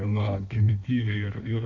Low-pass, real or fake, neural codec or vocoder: 7.2 kHz; fake; codec, 16 kHz in and 24 kHz out, 1.1 kbps, FireRedTTS-2 codec